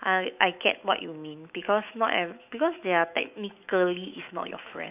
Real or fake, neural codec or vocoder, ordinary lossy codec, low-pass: fake; autoencoder, 48 kHz, 128 numbers a frame, DAC-VAE, trained on Japanese speech; none; 3.6 kHz